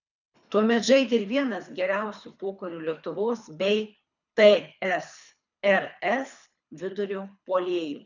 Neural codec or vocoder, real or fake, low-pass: codec, 24 kHz, 3 kbps, HILCodec; fake; 7.2 kHz